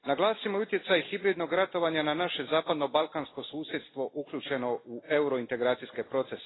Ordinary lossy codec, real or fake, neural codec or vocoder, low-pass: AAC, 16 kbps; real; none; 7.2 kHz